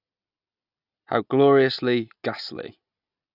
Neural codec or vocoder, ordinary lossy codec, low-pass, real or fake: none; none; 5.4 kHz; real